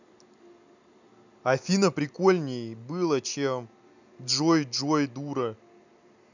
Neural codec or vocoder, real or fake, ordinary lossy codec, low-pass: none; real; none; 7.2 kHz